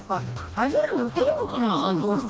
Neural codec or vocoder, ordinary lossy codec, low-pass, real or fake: codec, 16 kHz, 1 kbps, FreqCodec, smaller model; none; none; fake